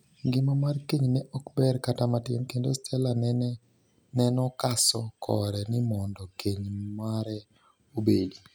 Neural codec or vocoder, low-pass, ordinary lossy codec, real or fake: none; none; none; real